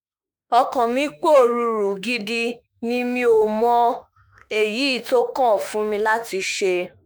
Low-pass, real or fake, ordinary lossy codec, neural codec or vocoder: none; fake; none; autoencoder, 48 kHz, 32 numbers a frame, DAC-VAE, trained on Japanese speech